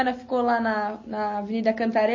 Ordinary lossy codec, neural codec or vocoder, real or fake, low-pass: MP3, 32 kbps; none; real; 7.2 kHz